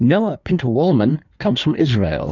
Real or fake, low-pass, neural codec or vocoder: fake; 7.2 kHz; codec, 16 kHz in and 24 kHz out, 1.1 kbps, FireRedTTS-2 codec